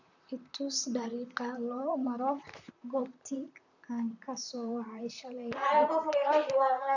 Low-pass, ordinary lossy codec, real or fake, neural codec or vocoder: 7.2 kHz; none; fake; vocoder, 44.1 kHz, 128 mel bands, Pupu-Vocoder